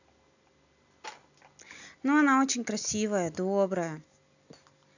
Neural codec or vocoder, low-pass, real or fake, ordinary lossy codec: none; 7.2 kHz; real; none